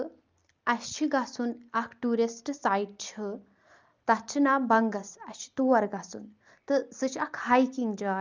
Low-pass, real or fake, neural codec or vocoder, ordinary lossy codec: 7.2 kHz; real; none; Opus, 24 kbps